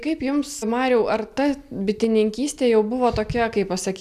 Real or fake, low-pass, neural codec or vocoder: real; 14.4 kHz; none